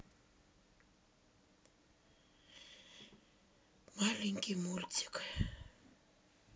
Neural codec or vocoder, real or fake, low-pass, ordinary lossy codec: none; real; none; none